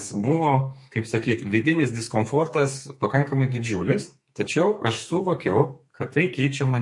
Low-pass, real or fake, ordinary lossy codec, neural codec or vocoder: 10.8 kHz; fake; MP3, 48 kbps; codec, 32 kHz, 1.9 kbps, SNAC